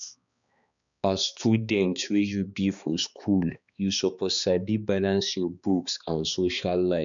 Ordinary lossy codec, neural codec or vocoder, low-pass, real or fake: none; codec, 16 kHz, 2 kbps, X-Codec, HuBERT features, trained on balanced general audio; 7.2 kHz; fake